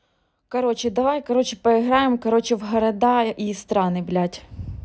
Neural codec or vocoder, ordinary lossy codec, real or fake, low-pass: none; none; real; none